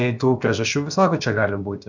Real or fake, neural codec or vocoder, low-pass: fake; codec, 16 kHz, about 1 kbps, DyCAST, with the encoder's durations; 7.2 kHz